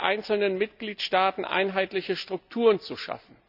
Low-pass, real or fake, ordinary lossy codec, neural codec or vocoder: 5.4 kHz; real; none; none